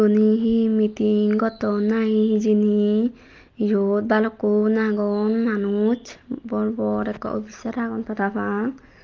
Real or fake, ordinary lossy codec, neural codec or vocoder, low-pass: real; Opus, 24 kbps; none; 7.2 kHz